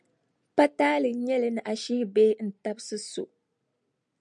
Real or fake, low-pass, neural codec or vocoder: real; 9.9 kHz; none